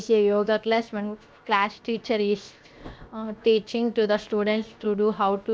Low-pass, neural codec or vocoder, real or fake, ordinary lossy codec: none; codec, 16 kHz, 0.7 kbps, FocalCodec; fake; none